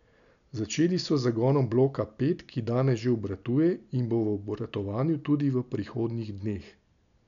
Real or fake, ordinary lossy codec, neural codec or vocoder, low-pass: real; none; none; 7.2 kHz